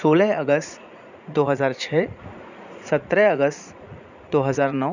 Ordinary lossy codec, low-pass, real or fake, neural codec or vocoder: none; 7.2 kHz; fake; vocoder, 44.1 kHz, 80 mel bands, Vocos